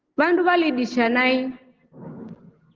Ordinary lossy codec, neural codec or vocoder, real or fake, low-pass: Opus, 16 kbps; none; real; 7.2 kHz